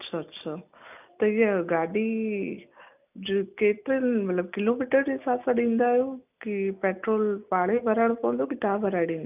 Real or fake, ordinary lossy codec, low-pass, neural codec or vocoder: real; none; 3.6 kHz; none